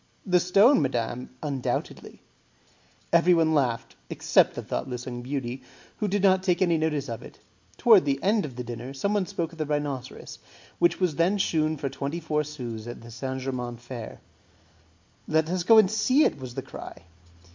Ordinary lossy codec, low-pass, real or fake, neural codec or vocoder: MP3, 64 kbps; 7.2 kHz; real; none